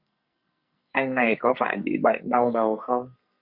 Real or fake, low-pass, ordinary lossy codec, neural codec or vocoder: fake; 5.4 kHz; Opus, 64 kbps; codec, 44.1 kHz, 2.6 kbps, SNAC